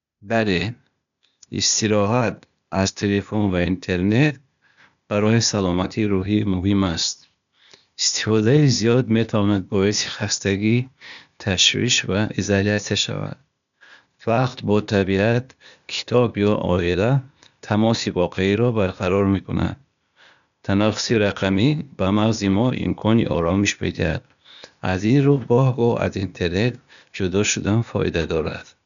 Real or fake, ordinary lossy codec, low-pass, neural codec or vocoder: fake; none; 7.2 kHz; codec, 16 kHz, 0.8 kbps, ZipCodec